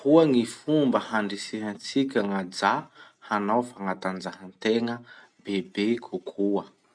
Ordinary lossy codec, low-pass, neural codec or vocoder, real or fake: MP3, 96 kbps; 9.9 kHz; none; real